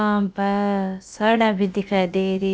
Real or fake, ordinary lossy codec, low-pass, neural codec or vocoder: fake; none; none; codec, 16 kHz, about 1 kbps, DyCAST, with the encoder's durations